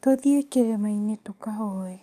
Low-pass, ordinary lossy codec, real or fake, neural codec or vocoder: 14.4 kHz; none; fake; codec, 44.1 kHz, 7.8 kbps, DAC